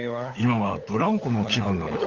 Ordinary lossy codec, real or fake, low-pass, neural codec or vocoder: Opus, 32 kbps; fake; 7.2 kHz; codec, 16 kHz, 16 kbps, FunCodec, trained on LibriTTS, 50 frames a second